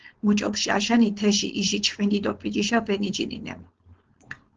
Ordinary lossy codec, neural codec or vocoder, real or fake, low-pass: Opus, 16 kbps; codec, 16 kHz, 4.8 kbps, FACodec; fake; 7.2 kHz